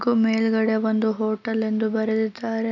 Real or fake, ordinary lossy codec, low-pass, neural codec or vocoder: real; none; 7.2 kHz; none